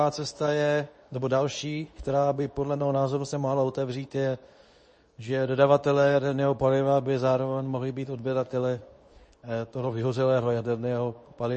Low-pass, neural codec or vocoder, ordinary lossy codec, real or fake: 10.8 kHz; codec, 24 kHz, 0.9 kbps, WavTokenizer, medium speech release version 2; MP3, 32 kbps; fake